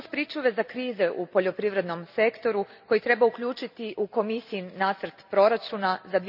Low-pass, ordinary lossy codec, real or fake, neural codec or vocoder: 5.4 kHz; none; real; none